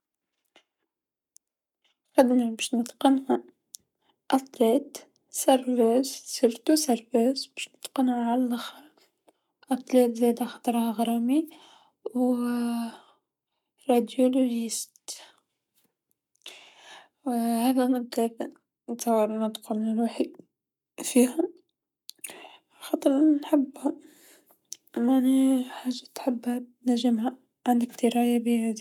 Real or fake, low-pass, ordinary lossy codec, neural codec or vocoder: fake; 19.8 kHz; none; codec, 44.1 kHz, 7.8 kbps, Pupu-Codec